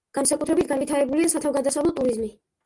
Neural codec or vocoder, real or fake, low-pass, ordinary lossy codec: none; real; 10.8 kHz; Opus, 24 kbps